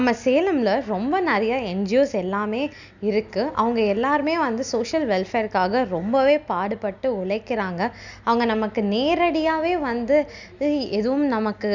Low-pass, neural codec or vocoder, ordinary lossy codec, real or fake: 7.2 kHz; none; none; real